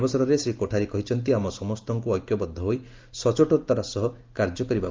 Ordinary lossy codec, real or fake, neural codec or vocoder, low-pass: Opus, 32 kbps; real; none; 7.2 kHz